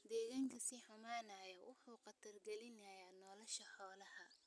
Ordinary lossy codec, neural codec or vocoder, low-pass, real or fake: none; none; none; real